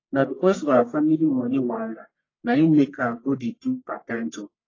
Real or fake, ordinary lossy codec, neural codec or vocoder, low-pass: fake; AAC, 32 kbps; codec, 44.1 kHz, 1.7 kbps, Pupu-Codec; 7.2 kHz